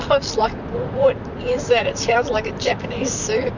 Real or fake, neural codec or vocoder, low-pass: fake; vocoder, 44.1 kHz, 128 mel bands, Pupu-Vocoder; 7.2 kHz